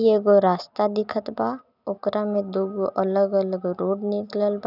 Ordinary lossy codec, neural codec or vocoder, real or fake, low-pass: none; none; real; 5.4 kHz